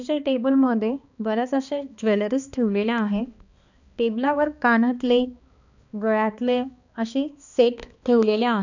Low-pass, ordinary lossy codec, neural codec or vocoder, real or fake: 7.2 kHz; none; codec, 16 kHz, 2 kbps, X-Codec, HuBERT features, trained on balanced general audio; fake